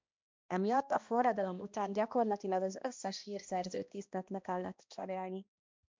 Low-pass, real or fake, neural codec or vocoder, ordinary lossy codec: 7.2 kHz; fake; codec, 16 kHz, 1 kbps, X-Codec, HuBERT features, trained on balanced general audio; MP3, 64 kbps